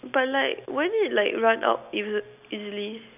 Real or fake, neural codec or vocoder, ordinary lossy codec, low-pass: real; none; none; 3.6 kHz